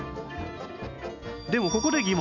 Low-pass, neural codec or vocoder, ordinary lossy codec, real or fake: 7.2 kHz; none; none; real